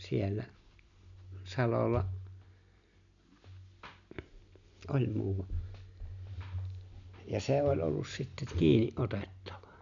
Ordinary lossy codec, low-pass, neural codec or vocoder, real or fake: none; 7.2 kHz; none; real